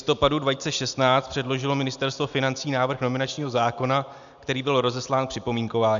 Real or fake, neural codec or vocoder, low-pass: real; none; 7.2 kHz